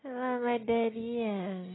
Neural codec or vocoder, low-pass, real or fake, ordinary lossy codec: none; 7.2 kHz; real; AAC, 16 kbps